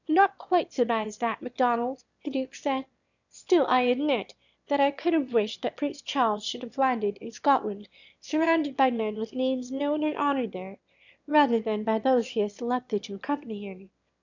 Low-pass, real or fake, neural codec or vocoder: 7.2 kHz; fake; autoencoder, 22.05 kHz, a latent of 192 numbers a frame, VITS, trained on one speaker